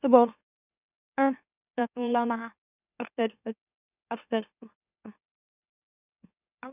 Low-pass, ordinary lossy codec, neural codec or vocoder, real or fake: 3.6 kHz; none; autoencoder, 44.1 kHz, a latent of 192 numbers a frame, MeloTTS; fake